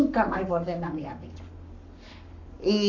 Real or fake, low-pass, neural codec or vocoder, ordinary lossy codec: fake; 7.2 kHz; codec, 44.1 kHz, 7.8 kbps, Pupu-Codec; none